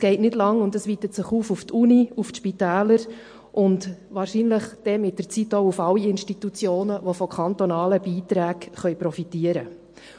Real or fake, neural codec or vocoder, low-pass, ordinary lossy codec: real; none; 9.9 kHz; MP3, 48 kbps